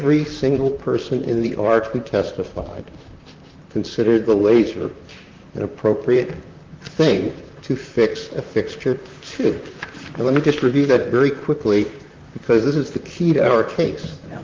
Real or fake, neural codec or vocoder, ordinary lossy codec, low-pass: fake; vocoder, 44.1 kHz, 128 mel bands, Pupu-Vocoder; Opus, 32 kbps; 7.2 kHz